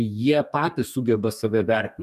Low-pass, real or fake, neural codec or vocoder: 14.4 kHz; fake; codec, 32 kHz, 1.9 kbps, SNAC